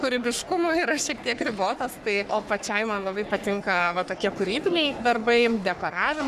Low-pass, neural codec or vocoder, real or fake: 14.4 kHz; codec, 44.1 kHz, 3.4 kbps, Pupu-Codec; fake